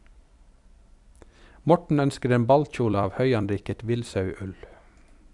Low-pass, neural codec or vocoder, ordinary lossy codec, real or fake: 10.8 kHz; vocoder, 44.1 kHz, 128 mel bands every 256 samples, BigVGAN v2; none; fake